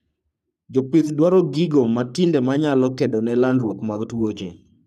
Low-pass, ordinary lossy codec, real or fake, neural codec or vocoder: 14.4 kHz; none; fake; codec, 44.1 kHz, 3.4 kbps, Pupu-Codec